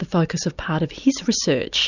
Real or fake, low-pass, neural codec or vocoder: real; 7.2 kHz; none